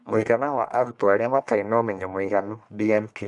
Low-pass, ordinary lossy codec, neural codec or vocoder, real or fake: 10.8 kHz; none; codec, 44.1 kHz, 1.7 kbps, Pupu-Codec; fake